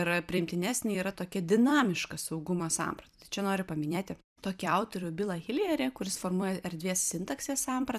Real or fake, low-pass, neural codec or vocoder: fake; 14.4 kHz; vocoder, 44.1 kHz, 128 mel bands every 256 samples, BigVGAN v2